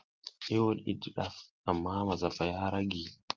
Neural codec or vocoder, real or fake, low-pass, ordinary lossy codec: none; real; 7.2 kHz; Opus, 32 kbps